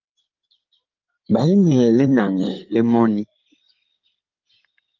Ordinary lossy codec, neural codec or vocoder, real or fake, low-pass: Opus, 24 kbps; codec, 16 kHz in and 24 kHz out, 2.2 kbps, FireRedTTS-2 codec; fake; 7.2 kHz